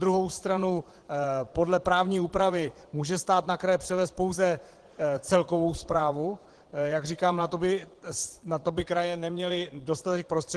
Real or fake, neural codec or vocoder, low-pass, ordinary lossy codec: fake; vocoder, 22.05 kHz, 80 mel bands, Vocos; 9.9 kHz; Opus, 16 kbps